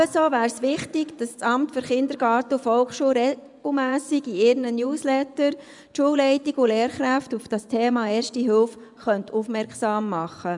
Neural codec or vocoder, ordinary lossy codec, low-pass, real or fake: vocoder, 24 kHz, 100 mel bands, Vocos; none; 10.8 kHz; fake